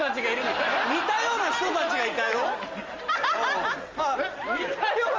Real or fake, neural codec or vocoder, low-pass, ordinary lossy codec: real; none; 7.2 kHz; Opus, 32 kbps